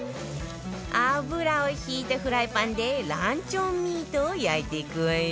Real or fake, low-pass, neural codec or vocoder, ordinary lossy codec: real; none; none; none